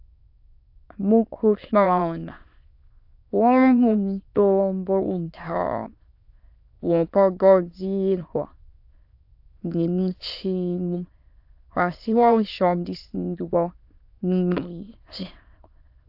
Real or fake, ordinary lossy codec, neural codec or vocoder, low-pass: fake; AAC, 48 kbps; autoencoder, 22.05 kHz, a latent of 192 numbers a frame, VITS, trained on many speakers; 5.4 kHz